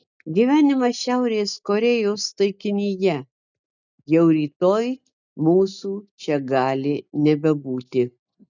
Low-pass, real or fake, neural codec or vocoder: 7.2 kHz; real; none